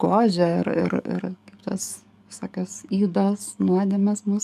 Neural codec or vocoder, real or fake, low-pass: codec, 44.1 kHz, 7.8 kbps, DAC; fake; 14.4 kHz